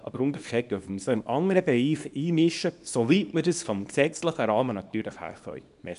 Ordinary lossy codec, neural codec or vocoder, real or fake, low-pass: none; codec, 24 kHz, 0.9 kbps, WavTokenizer, small release; fake; 10.8 kHz